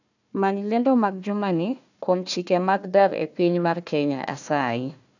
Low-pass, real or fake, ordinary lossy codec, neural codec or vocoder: 7.2 kHz; fake; none; codec, 16 kHz, 1 kbps, FunCodec, trained on Chinese and English, 50 frames a second